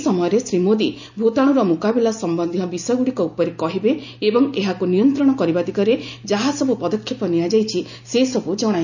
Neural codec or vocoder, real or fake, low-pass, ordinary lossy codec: none; real; 7.2 kHz; none